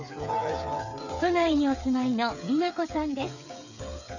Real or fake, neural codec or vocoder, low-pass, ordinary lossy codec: fake; codec, 16 kHz, 8 kbps, FreqCodec, smaller model; 7.2 kHz; none